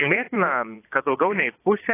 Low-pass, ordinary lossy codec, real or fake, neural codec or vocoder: 3.6 kHz; AAC, 24 kbps; fake; vocoder, 22.05 kHz, 80 mel bands, Vocos